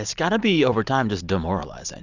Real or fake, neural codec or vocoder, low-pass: real; none; 7.2 kHz